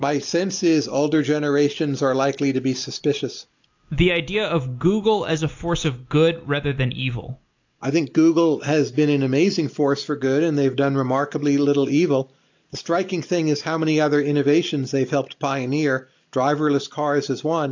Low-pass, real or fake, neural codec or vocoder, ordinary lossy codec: 7.2 kHz; real; none; AAC, 48 kbps